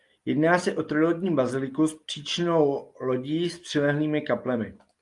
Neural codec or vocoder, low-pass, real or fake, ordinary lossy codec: none; 10.8 kHz; real; Opus, 32 kbps